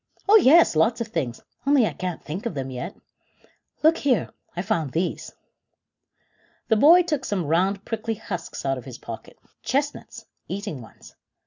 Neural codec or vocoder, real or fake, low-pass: none; real; 7.2 kHz